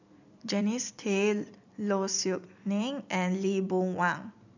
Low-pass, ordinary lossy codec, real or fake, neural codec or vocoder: 7.2 kHz; none; fake; vocoder, 44.1 kHz, 128 mel bands every 256 samples, BigVGAN v2